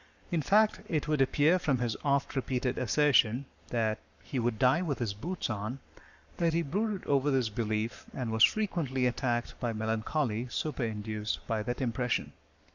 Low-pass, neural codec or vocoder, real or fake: 7.2 kHz; codec, 44.1 kHz, 7.8 kbps, Pupu-Codec; fake